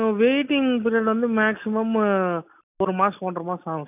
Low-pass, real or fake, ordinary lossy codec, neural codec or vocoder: 3.6 kHz; real; none; none